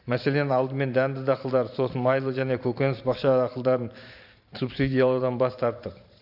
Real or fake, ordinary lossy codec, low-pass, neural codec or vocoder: fake; AAC, 48 kbps; 5.4 kHz; vocoder, 44.1 kHz, 128 mel bands every 512 samples, BigVGAN v2